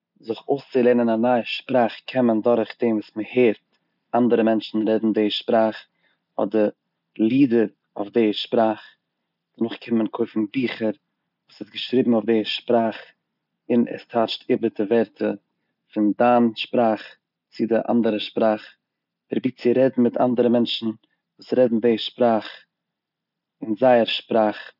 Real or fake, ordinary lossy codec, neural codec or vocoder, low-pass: real; none; none; 5.4 kHz